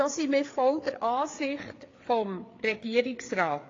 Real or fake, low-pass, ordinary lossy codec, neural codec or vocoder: fake; 7.2 kHz; AAC, 32 kbps; codec, 16 kHz, 4 kbps, FreqCodec, larger model